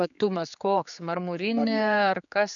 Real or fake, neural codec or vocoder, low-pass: fake; codec, 16 kHz, 4 kbps, X-Codec, HuBERT features, trained on general audio; 7.2 kHz